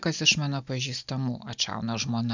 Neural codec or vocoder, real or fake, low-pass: none; real; 7.2 kHz